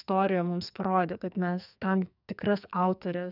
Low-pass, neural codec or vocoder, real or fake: 5.4 kHz; codec, 44.1 kHz, 2.6 kbps, SNAC; fake